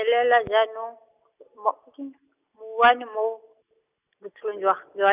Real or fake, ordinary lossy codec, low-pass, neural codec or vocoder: real; none; 3.6 kHz; none